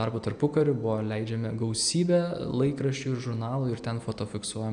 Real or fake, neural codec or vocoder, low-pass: real; none; 9.9 kHz